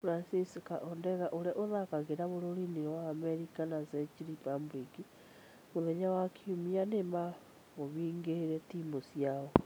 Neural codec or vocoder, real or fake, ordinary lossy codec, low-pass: none; real; none; none